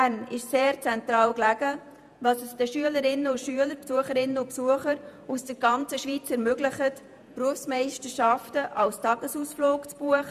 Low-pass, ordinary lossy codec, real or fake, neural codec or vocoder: 14.4 kHz; none; fake; vocoder, 48 kHz, 128 mel bands, Vocos